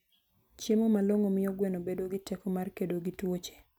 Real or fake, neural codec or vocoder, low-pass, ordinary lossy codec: real; none; none; none